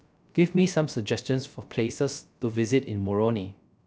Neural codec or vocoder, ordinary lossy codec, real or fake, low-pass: codec, 16 kHz, 0.3 kbps, FocalCodec; none; fake; none